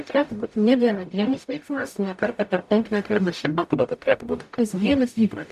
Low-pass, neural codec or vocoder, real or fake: 14.4 kHz; codec, 44.1 kHz, 0.9 kbps, DAC; fake